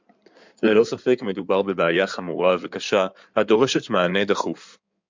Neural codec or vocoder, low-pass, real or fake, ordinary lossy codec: codec, 16 kHz in and 24 kHz out, 2.2 kbps, FireRedTTS-2 codec; 7.2 kHz; fake; MP3, 64 kbps